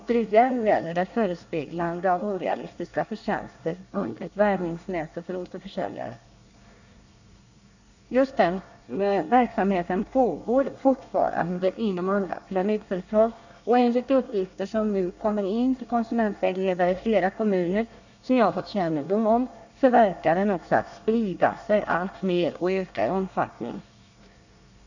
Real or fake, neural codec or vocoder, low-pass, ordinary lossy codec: fake; codec, 24 kHz, 1 kbps, SNAC; 7.2 kHz; none